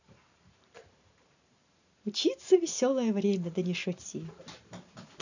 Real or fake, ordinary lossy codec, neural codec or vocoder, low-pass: fake; none; vocoder, 44.1 kHz, 128 mel bands every 512 samples, BigVGAN v2; 7.2 kHz